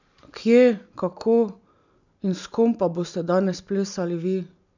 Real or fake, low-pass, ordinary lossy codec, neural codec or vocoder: real; 7.2 kHz; none; none